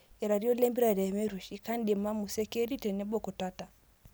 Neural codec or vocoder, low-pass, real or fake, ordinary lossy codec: none; none; real; none